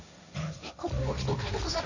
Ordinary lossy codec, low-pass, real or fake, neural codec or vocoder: none; 7.2 kHz; fake; codec, 16 kHz, 1.1 kbps, Voila-Tokenizer